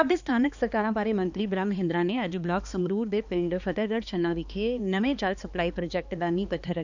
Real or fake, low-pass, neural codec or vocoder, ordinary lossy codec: fake; 7.2 kHz; codec, 16 kHz, 2 kbps, X-Codec, HuBERT features, trained on balanced general audio; none